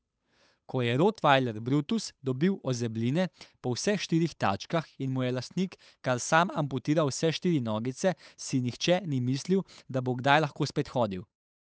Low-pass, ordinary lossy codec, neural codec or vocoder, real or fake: none; none; codec, 16 kHz, 8 kbps, FunCodec, trained on Chinese and English, 25 frames a second; fake